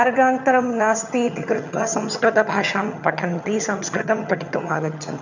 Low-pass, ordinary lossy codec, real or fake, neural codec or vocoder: 7.2 kHz; none; fake; vocoder, 22.05 kHz, 80 mel bands, HiFi-GAN